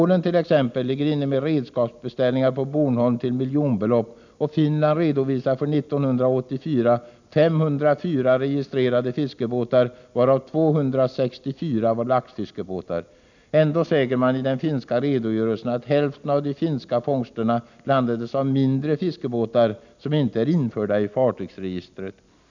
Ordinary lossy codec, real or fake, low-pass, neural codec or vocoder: none; real; 7.2 kHz; none